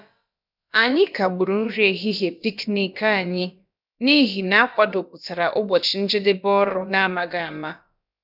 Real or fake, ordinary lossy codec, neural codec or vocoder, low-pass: fake; none; codec, 16 kHz, about 1 kbps, DyCAST, with the encoder's durations; 5.4 kHz